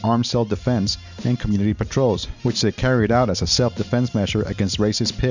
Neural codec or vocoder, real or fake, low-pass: none; real; 7.2 kHz